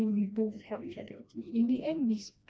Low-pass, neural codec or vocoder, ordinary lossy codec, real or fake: none; codec, 16 kHz, 1 kbps, FreqCodec, smaller model; none; fake